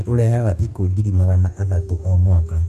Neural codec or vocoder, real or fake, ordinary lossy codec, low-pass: codec, 44.1 kHz, 2.6 kbps, DAC; fake; none; 14.4 kHz